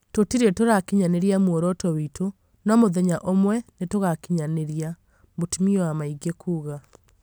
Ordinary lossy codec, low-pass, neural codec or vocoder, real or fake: none; none; none; real